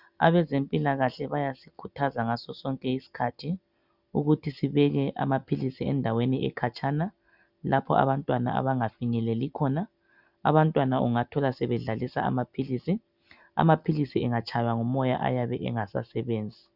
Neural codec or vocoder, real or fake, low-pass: none; real; 5.4 kHz